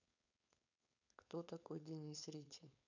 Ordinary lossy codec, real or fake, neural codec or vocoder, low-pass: none; fake; codec, 16 kHz, 4.8 kbps, FACodec; 7.2 kHz